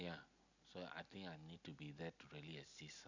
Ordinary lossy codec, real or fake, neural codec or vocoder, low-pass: none; real; none; 7.2 kHz